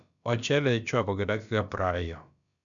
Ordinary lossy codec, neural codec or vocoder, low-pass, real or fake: none; codec, 16 kHz, about 1 kbps, DyCAST, with the encoder's durations; 7.2 kHz; fake